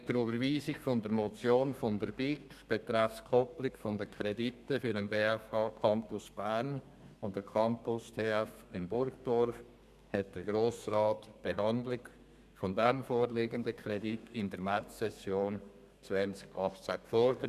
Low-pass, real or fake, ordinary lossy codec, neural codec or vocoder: 14.4 kHz; fake; none; codec, 32 kHz, 1.9 kbps, SNAC